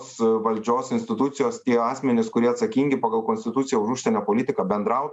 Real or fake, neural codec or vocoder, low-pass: real; none; 10.8 kHz